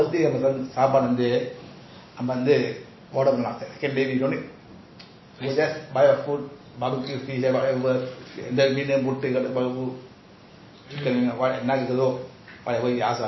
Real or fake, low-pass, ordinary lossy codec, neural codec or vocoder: real; 7.2 kHz; MP3, 24 kbps; none